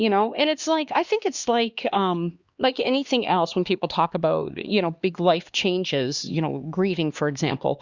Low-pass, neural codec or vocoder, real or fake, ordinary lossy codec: 7.2 kHz; codec, 16 kHz, 2 kbps, X-Codec, HuBERT features, trained on LibriSpeech; fake; Opus, 64 kbps